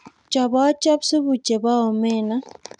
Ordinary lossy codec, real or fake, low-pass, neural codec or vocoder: none; real; 10.8 kHz; none